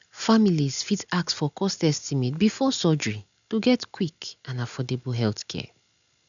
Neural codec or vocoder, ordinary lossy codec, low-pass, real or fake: none; none; 7.2 kHz; real